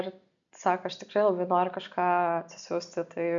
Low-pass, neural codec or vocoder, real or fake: 7.2 kHz; none; real